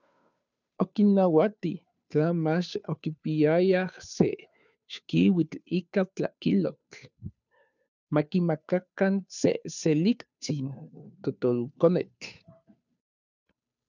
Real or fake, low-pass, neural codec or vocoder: fake; 7.2 kHz; codec, 16 kHz, 2 kbps, FunCodec, trained on Chinese and English, 25 frames a second